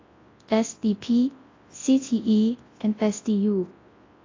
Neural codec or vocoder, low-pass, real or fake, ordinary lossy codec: codec, 24 kHz, 0.9 kbps, WavTokenizer, large speech release; 7.2 kHz; fake; AAC, 32 kbps